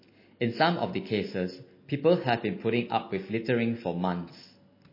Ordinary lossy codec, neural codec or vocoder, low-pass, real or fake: MP3, 24 kbps; none; 5.4 kHz; real